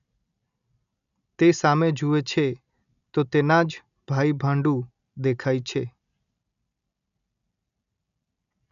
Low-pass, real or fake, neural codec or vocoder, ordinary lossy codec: 7.2 kHz; real; none; none